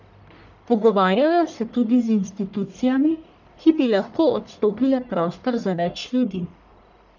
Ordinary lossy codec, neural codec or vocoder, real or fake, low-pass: none; codec, 44.1 kHz, 1.7 kbps, Pupu-Codec; fake; 7.2 kHz